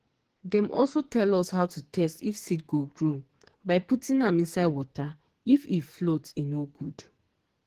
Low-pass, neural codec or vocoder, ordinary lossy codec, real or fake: 14.4 kHz; codec, 44.1 kHz, 2.6 kbps, SNAC; Opus, 16 kbps; fake